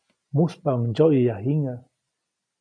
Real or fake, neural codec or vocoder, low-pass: real; none; 9.9 kHz